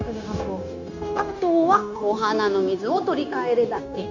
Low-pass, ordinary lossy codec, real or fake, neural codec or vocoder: 7.2 kHz; none; fake; codec, 16 kHz, 0.9 kbps, LongCat-Audio-Codec